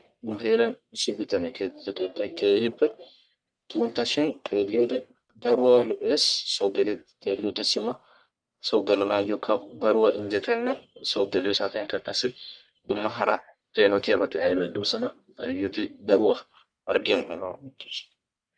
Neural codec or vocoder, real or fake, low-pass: codec, 44.1 kHz, 1.7 kbps, Pupu-Codec; fake; 9.9 kHz